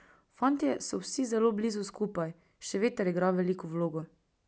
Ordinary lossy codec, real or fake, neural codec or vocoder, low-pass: none; real; none; none